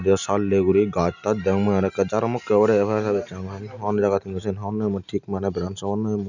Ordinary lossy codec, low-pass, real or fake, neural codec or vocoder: none; 7.2 kHz; real; none